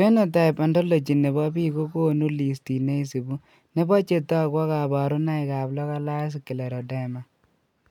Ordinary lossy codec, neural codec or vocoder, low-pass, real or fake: none; none; 19.8 kHz; real